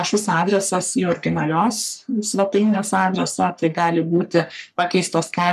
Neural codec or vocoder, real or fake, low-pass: codec, 44.1 kHz, 3.4 kbps, Pupu-Codec; fake; 14.4 kHz